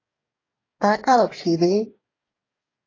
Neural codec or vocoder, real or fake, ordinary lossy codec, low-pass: codec, 44.1 kHz, 2.6 kbps, DAC; fake; AAC, 32 kbps; 7.2 kHz